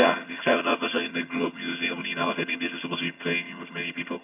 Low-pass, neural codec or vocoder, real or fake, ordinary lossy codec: 3.6 kHz; vocoder, 22.05 kHz, 80 mel bands, HiFi-GAN; fake; none